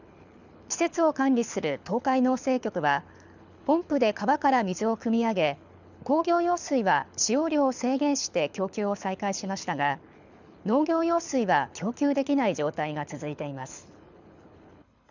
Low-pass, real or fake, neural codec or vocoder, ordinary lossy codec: 7.2 kHz; fake; codec, 24 kHz, 6 kbps, HILCodec; none